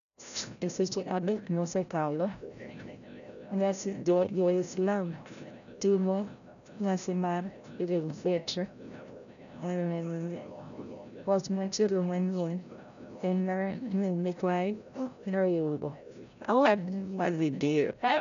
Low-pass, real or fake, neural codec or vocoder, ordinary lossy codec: 7.2 kHz; fake; codec, 16 kHz, 0.5 kbps, FreqCodec, larger model; none